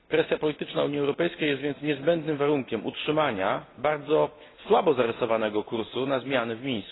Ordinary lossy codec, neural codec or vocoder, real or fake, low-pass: AAC, 16 kbps; none; real; 7.2 kHz